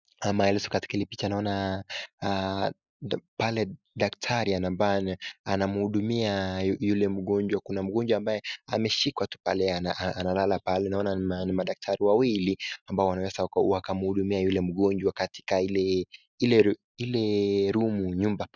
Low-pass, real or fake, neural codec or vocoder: 7.2 kHz; real; none